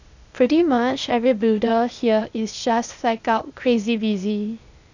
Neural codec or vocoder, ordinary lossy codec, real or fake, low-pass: codec, 16 kHz, 0.8 kbps, ZipCodec; none; fake; 7.2 kHz